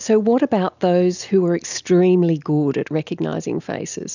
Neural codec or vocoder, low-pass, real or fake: none; 7.2 kHz; real